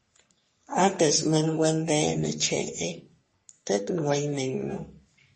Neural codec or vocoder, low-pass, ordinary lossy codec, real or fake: codec, 44.1 kHz, 3.4 kbps, Pupu-Codec; 10.8 kHz; MP3, 32 kbps; fake